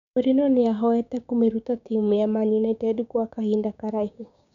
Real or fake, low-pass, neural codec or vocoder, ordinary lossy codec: fake; 7.2 kHz; codec, 16 kHz, 6 kbps, DAC; none